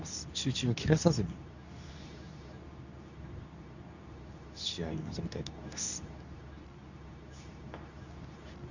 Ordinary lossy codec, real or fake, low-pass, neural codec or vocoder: none; fake; 7.2 kHz; codec, 24 kHz, 0.9 kbps, WavTokenizer, medium speech release version 2